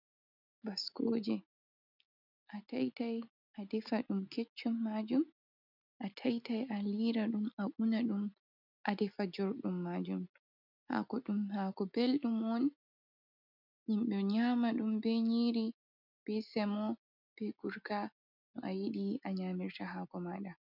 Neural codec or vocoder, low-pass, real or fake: none; 5.4 kHz; real